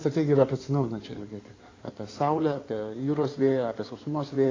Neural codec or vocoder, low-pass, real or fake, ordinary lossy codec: codec, 16 kHz in and 24 kHz out, 2.2 kbps, FireRedTTS-2 codec; 7.2 kHz; fake; AAC, 32 kbps